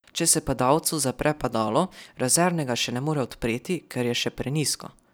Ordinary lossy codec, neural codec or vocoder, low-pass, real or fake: none; none; none; real